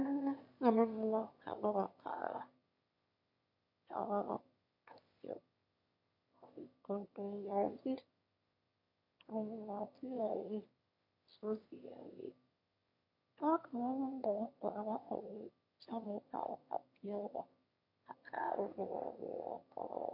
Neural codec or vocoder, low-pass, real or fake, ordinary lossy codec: autoencoder, 22.05 kHz, a latent of 192 numbers a frame, VITS, trained on one speaker; 5.4 kHz; fake; MP3, 32 kbps